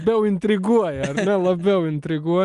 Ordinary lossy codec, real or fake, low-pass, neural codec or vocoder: Opus, 32 kbps; real; 10.8 kHz; none